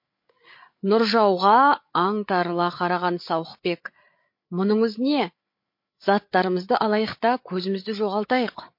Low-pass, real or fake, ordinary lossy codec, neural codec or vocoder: 5.4 kHz; fake; MP3, 32 kbps; codec, 16 kHz, 8 kbps, FreqCodec, larger model